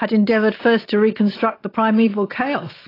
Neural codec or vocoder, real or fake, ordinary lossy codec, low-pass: none; real; AAC, 24 kbps; 5.4 kHz